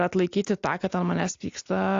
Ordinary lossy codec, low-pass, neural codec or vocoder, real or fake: AAC, 48 kbps; 7.2 kHz; none; real